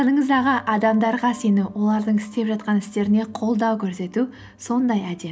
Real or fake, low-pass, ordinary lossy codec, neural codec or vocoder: real; none; none; none